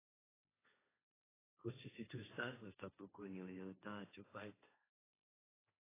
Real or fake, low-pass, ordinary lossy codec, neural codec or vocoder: fake; 3.6 kHz; AAC, 16 kbps; codec, 16 kHz, 1.1 kbps, Voila-Tokenizer